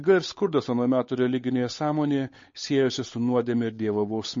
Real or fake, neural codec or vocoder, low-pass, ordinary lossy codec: fake; codec, 16 kHz, 8 kbps, FunCodec, trained on Chinese and English, 25 frames a second; 7.2 kHz; MP3, 32 kbps